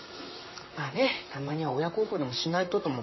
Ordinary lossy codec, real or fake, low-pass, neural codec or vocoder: MP3, 24 kbps; fake; 7.2 kHz; vocoder, 44.1 kHz, 128 mel bands, Pupu-Vocoder